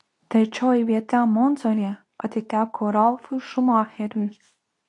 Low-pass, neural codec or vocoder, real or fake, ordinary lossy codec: 10.8 kHz; codec, 24 kHz, 0.9 kbps, WavTokenizer, medium speech release version 2; fake; AAC, 48 kbps